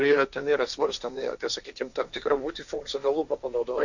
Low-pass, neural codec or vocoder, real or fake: 7.2 kHz; codec, 16 kHz, 1.1 kbps, Voila-Tokenizer; fake